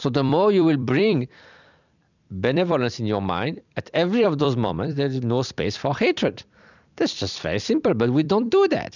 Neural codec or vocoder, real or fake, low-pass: vocoder, 44.1 kHz, 128 mel bands every 256 samples, BigVGAN v2; fake; 7.2 kHz